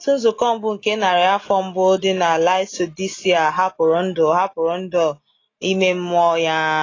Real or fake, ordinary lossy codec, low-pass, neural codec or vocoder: real; AAC, 32 kbps; 7.2 kHz; none